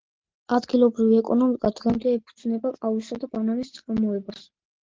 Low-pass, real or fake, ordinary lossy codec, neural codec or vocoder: 7.2 kHz; real; Opus, 16 kbps; none